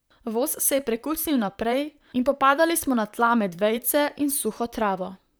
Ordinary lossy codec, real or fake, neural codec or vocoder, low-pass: none; fake; vocoder, 44.1 kHz, 128 mel bands, Pupu-Vocoder; none